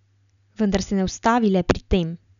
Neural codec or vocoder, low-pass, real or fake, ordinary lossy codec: none; 7.2 kHz; real; none